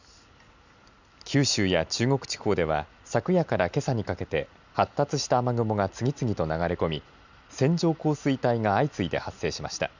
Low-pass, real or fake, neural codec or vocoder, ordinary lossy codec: 7.2 kHz; real; none; none